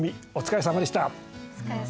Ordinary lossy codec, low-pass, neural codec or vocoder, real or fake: none; none; none; real